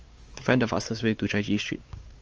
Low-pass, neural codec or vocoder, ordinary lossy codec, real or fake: 7.2 kHz; codec, 16 kHz, 4 kbps, X-Codec, WavLM features, trained on Multilingual LibriSpeech; Opus, 24 kbps; fake